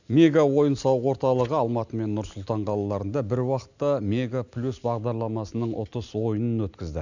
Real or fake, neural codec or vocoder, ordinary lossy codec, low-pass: real; none; none; 7.2 kHz